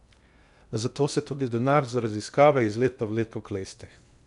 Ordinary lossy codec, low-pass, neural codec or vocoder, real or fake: MP3, 96 kbps; 10.8 kHz; codec, 16 kHz in and 24 kHz out, 0.8 kbps, FocalCodec, streaming, 65536 codes; fake